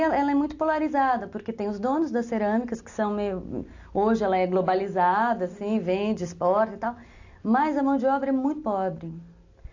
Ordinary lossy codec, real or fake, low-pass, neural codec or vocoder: AAC, 48 kbps; real; 7.2 kHz; none